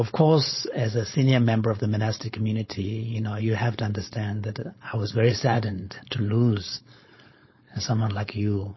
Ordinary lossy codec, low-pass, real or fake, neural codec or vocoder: MP3, 24 kbps; 7.2 kHz; fake; codec, 16 kHz, 4.8 kbps, FACodec